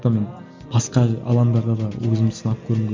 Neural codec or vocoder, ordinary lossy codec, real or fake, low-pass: none; MP3, 64 kbps; real; 7.2 kHz